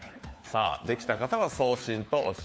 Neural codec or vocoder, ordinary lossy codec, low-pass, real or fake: codec, 16 kHz, 4 kbps, FunCodec, trained on LibriTTS, 50 frames a second; none; none; fake